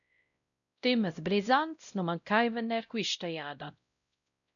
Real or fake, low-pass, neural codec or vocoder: fake; 7.2 kHz; codec, 16 kHz, 0.5 kbps, X-Codec, WavLM features, trained on Multilingual LibriSpeech